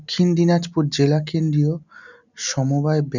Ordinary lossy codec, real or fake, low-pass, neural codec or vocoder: none; real; 7.2 kHz; none